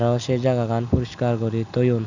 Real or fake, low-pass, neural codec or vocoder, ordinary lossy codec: real; 7.2 kHz; none; none